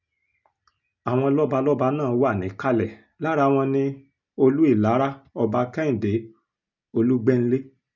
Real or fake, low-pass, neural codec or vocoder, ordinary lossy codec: real; 7.2 kHz; none; none